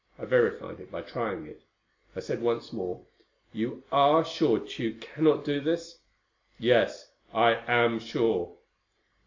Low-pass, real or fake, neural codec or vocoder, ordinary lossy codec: 7.2 kHz; real; none; MP3, 64 kbps